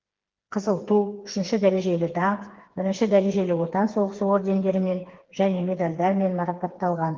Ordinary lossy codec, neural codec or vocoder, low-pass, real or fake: Opus, 16 kbps; codec, 16 kHz, 4 kbps, FreqCodec, smaller model; 7.2 kHz; fake